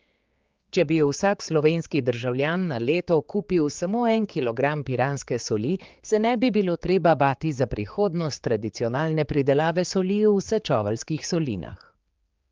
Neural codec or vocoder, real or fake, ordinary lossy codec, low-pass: codec, 16 kHz, 4 kbps, X-Codec, HuBERT features, trained on general audio; fake; Opus, 32 kbps; 7.2 kHz